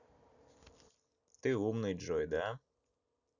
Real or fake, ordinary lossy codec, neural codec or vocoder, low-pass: real; none; none; 7.2 kHz